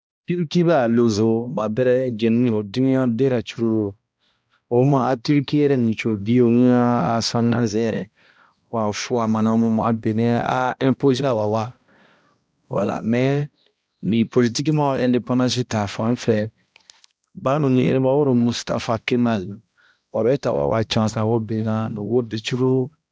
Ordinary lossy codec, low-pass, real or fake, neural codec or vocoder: none; none; fake; codec, 16 kHz, 1 kbps, X-Codec, HuBERT features, trained on balanced general audio